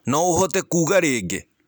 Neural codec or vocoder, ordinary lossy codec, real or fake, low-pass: none; none; real; none